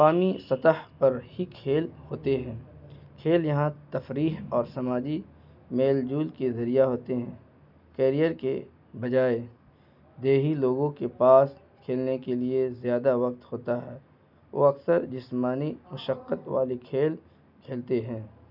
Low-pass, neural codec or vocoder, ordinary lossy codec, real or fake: 5.4 kHz; none; none; real